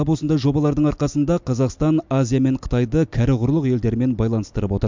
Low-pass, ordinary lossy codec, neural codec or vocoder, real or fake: 7.2 kHz; MP3, 64 kbps; none; real